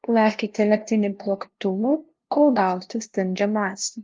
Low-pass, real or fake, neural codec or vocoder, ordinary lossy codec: 7.2 kHz; fake; codec, 16 kHz, 0.5 kbps, FunCodec, trained on LibriTTS, 25 frames a second; Opus, 32 kbps